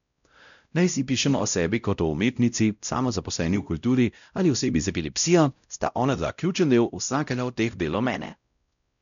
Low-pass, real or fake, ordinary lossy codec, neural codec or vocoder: 7.2 kHz; fake; MP3, 96 kbps; codec, 16 kHz, 0.5 kbps, X-Codec, WavLM features, trained on Multilingual LibriSpeech